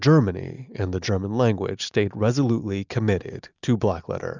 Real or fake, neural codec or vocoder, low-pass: real; none; 7.2 kHz